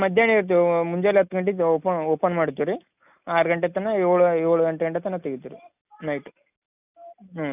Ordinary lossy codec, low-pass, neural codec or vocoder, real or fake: none; 3.6 kHz; none; real